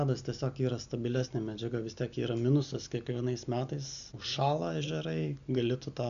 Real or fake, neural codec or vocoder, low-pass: real; none; 7.2 kHz